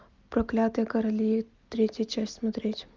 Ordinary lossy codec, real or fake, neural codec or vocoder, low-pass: Opus, 32 kbps; real; none; 7.2 kHz